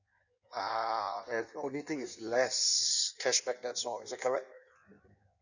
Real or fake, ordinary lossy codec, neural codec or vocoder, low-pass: fake; none; codec, 16 kHz in and 24 kHz out, 1.1 kbps, FireRedTTS-2 codec; 7.2 kHz